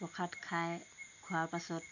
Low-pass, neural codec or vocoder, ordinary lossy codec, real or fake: 7.2 kHz; none; none; real